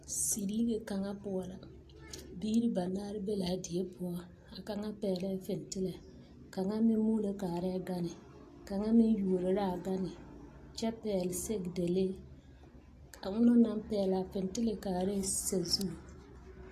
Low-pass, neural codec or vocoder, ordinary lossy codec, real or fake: 14.4 kHz; none; AAC, 48 kbps; real